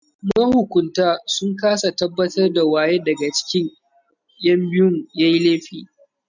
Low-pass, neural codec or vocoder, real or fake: 7.2 kHz; none; real